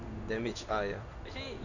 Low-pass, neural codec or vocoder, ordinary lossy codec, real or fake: 7.2 kHz; codec, 16 kHz in and 24 kHz out, 1 kbps, XY-Tokenizer; none; fake